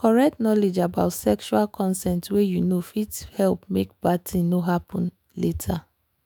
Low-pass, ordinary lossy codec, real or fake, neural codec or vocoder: none; none; fake; autoencoder, 48 kHz, 128 numbers a frame, DAC-VAE, trained on Japanese speech